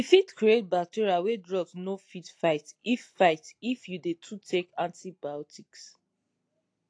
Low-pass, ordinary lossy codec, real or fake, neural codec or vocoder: 9.9 kHz; AAC, 48 kbps; real; none